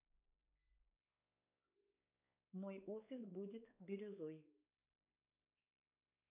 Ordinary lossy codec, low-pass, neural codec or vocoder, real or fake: none; 3.6 kHz; codec, 16 kHz, 4 kbps, X-Codec, WavLM features, trained on Multilingual LibriSpeech; fake